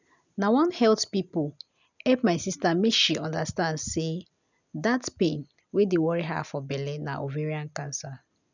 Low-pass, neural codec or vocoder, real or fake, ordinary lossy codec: 7.2 kHz; none; real; none